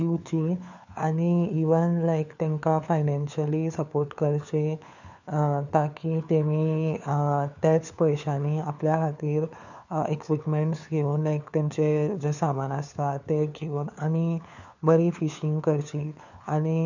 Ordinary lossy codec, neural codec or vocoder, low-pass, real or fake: none; codec, 16 kHz, 4 kbps, FunCodec, trained on LibriTTS, 50 frames a second; 7.2 kHz; fake